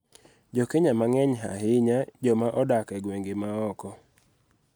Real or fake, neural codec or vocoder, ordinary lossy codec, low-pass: real; none; none; none